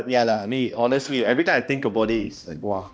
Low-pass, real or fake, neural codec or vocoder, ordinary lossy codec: none; fake; codec, 16 kHz, 1 kbps, X-Codec, HuBERT features, trained on balanced general audio; none